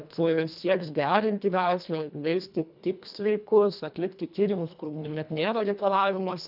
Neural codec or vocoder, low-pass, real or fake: codec, 24 kHz, 1.5 kbps, HILCodec; 5.4 kHz; fake